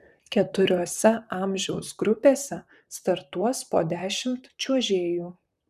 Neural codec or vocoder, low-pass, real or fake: vocoder, 44.1 kHz, 128 mel bands, Pupu-Vocoder; 14.4 kHz; fake